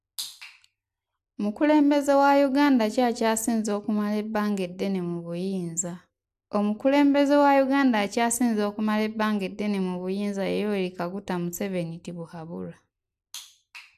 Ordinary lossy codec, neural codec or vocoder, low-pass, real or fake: AAC, 96 kbps; none; 14.4 kHz; real